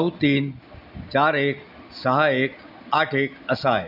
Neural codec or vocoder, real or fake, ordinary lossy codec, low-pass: none; real; none; 5.4 kHz